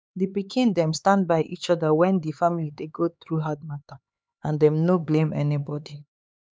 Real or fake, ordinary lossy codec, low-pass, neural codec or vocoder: fake; none; none; codec, 16 kHz, 4 kbps, X-Codec, HuBERT features, trained on LibriSpeech